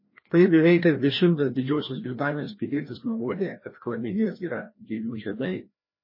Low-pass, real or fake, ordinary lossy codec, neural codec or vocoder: 5.4 kHz; fake; MP3, 24 kbps; codec, 16 kHz, 1 kbps, FreqCodec, larger model